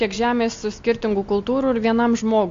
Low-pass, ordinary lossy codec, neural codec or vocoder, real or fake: 7.2 kHz; AAC, 48 kbps; none; real